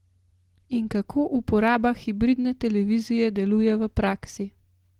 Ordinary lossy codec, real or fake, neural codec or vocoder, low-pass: Opus, 16 kbps; real; none; 19.8 kHz